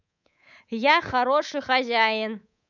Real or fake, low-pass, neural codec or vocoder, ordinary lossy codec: fake; 7.2 kHz; codec, 24 kHz, 3.1 kbps, DualCodec; none